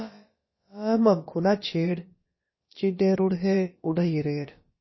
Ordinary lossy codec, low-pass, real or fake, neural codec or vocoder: MP3, 24 kbps; 7.2 kHz; fake; codec, 16 kHz, about 1 kbps, DyCAST, with the encoder's durations